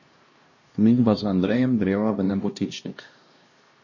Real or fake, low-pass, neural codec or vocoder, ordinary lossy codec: fake; 7.2 kHz; codec, 16 kHz, 1 kbps, X-Codec, HuBERT features, trained on LibriSpeech; MP3, 32 kbps